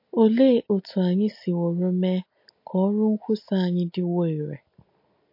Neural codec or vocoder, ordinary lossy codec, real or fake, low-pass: none; MP3, 32 kbps; real; 5.4 kHz